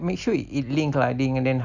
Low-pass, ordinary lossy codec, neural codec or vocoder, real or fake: 7.2 kHz; none; none; real